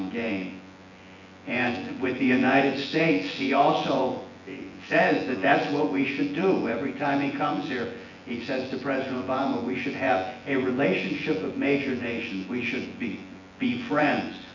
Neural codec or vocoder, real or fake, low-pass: vocoder, 24 kHz, 100 mel bands, Vocos; fake; 7.2 kHz